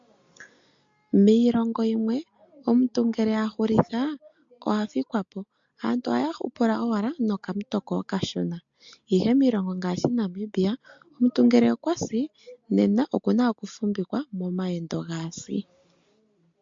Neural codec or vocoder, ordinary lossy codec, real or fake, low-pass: none; MP3, 48 kbps; real; 7.2 kHz